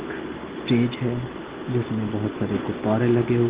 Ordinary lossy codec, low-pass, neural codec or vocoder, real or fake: Opus, 16 kbps; 3.6 kHz; none; real